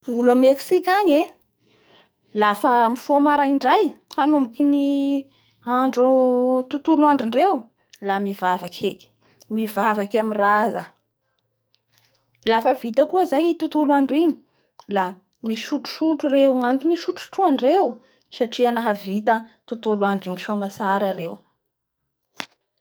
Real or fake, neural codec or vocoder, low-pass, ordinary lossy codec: fake; codec, 44.1 kHz, 2.6 kbps, SNAC; none; none